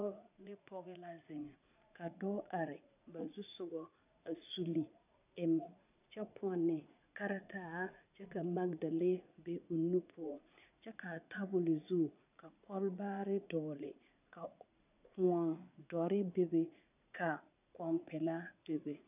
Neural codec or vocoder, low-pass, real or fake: vocoder, 44.1 kHz, 80 mel bands, Vocos; 3.6 kHz; fake